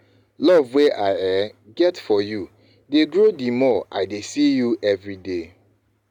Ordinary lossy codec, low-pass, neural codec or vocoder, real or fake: none; 19.8 kHz; none; real